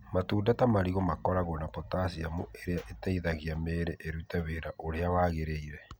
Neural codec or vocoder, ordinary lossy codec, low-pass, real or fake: none; none; none; real